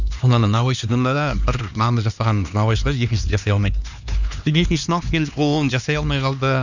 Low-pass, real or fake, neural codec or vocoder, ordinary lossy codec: 7.2 kHz; fake; codec, 16 kHz, 2 kbps, X-Codec, HuBERT features, trained on LibriSpeech; none